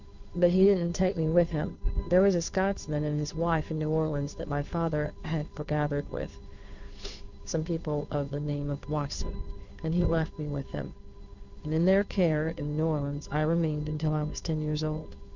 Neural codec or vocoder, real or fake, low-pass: codec, 16 kHz, 2 kbps, FunCodec, trained on Chinese and English, 25 frames a second; fake; 7.2 kHz